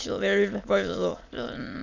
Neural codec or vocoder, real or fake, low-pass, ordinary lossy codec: autoencoder, 22.05 kHz, a latent of 192 numbers a frame, VITS, trained on many speakers; fake; 7.2 kHz; none